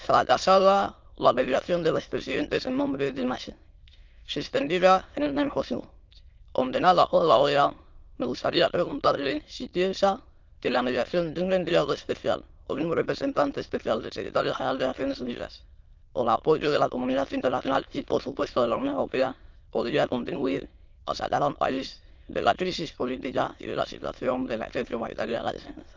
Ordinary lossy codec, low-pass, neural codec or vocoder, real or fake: Opus, 24 kbps; 7.2 kHz; autoencoder, 22.05 kHz, a latent of 192 numbers a frame, VITS, trained on many speakers; fake